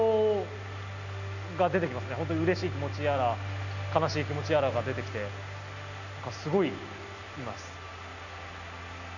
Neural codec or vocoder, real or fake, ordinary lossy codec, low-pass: none; real; none; 7.2 kHz